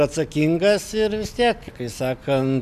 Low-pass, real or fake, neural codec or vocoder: 14.4 kHz; real; none